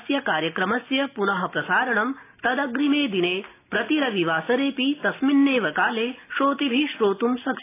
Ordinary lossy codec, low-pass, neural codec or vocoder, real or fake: AAC, 24 kbps; 3.6 kHz; none; real